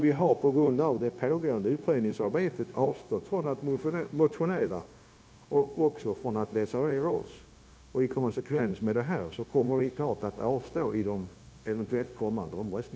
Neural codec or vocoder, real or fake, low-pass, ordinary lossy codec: codec, 16 kHz, 0.9 kbps, LongCat-Audio-Codec; fake; none; none